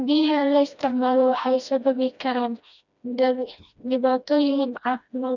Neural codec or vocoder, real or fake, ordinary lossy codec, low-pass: codec, 16 kHz, 1 kbps, FreqCodec, smaller model; fake; none; 7.2 kHz